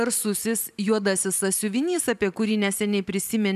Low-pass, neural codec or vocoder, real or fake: 14.4 kHz; vocoder, 44.1 kHz, 128 mel bands every 512 samples, BigVGAN v2; fake